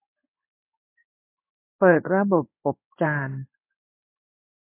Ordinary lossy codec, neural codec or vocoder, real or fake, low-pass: AAC, 24 kbps; codec, 16 kHz in and 24 kHz out, 1 kbps, XY-Tokenizer; fake; 3.6 kHz